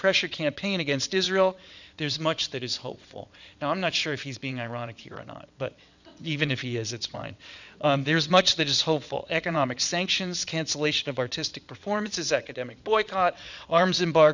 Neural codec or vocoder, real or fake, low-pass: vocoder, 22.05 kHz, 80 mel bands, WaveNeXt; fake; 7.2 kHz